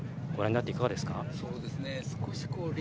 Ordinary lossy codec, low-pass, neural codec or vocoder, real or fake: none; none; none; real